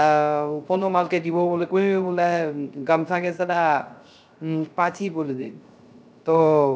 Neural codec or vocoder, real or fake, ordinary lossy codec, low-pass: codec, 16 kHz, 0.3 kbps, FocalCodec; fake; none; none